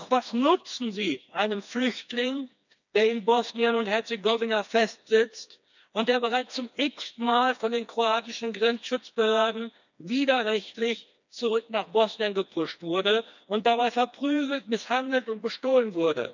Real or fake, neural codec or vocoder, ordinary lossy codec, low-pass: fake; codec, 16 kHz, 2 kbps, FreqCodec, smaller model; none; 7.2 kHz